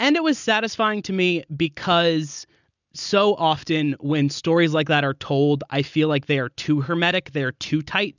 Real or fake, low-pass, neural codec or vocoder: real; 7.2 kHz; none